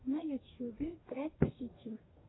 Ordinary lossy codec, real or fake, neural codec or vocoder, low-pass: AAC, 16 kbps; fake; codec, 44.1 kHz, 2.6 kbps, DAC; 7.2 kHz